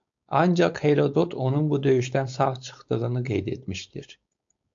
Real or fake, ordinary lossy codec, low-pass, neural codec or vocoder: fake; AAC, 64 kbps; 7.2 kHz; codec, 16 kHz, 4.8 kbps, FACodec